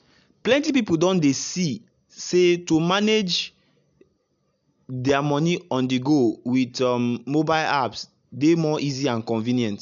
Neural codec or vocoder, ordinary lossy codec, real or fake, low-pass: none; none; real; 7.2 kHz